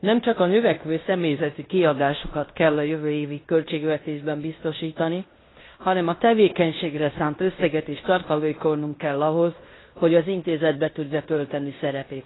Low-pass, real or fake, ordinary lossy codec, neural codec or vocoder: 7.2 kHz; fake; AAC, 16 kbps; codec, 16 kHz in and 24 kHz out, 0.9 kbps, LongCat-Audio-Codec, fine tuned four codebook decoder